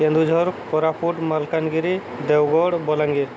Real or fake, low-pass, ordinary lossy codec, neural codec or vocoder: real; none; none; none